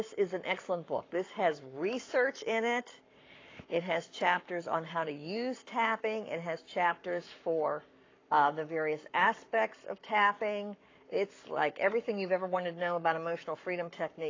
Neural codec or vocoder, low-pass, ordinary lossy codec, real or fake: codec, 44.1 kHz, 7.8 kbps, Pupu-Codec; 7.2 kHz; AAC, 32 kbps; fake